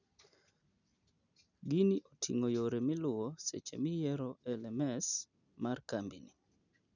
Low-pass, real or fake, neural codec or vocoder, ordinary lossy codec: 7.2 kHz; real; none; none